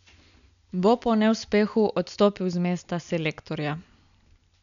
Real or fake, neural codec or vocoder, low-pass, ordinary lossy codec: real; none; 7.2 kHz; none